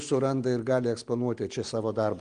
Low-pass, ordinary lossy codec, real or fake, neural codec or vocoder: 9.9 kHz; Opus, 24 kbps; real; none